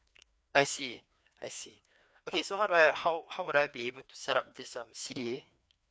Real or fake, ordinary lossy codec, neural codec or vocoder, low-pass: fake; none; codec, 16 kHz, 2 kbps, FreqCodec, larger model; none